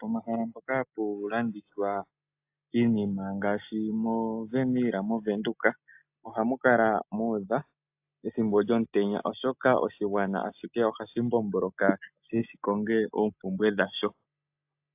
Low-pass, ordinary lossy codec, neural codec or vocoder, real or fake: 3.6 kHz; AAC, 32 kbps; none; real